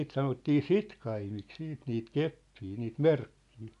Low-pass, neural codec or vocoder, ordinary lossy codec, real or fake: 10.8 kHz; none; MP3, 96 kbps; real